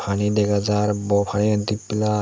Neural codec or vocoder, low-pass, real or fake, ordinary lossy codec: none; none; real; none